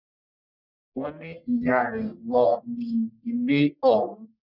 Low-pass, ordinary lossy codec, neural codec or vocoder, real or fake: 5.4 kHz; none; codec, 44.1 kHz, 1.7 kbps, Pupu-Codec; fake